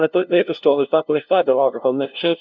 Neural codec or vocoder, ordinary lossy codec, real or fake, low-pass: codec, 16 kHz, 0.5 kbps, FunCodec, trained on LibriTTS, 25 frames a second; MP3, 64 kbps; fake; 7.2 kHz